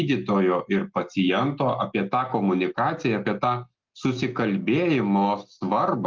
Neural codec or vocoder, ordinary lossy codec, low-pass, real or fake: none; Opus, 32 kbps; 7.2 kHz; real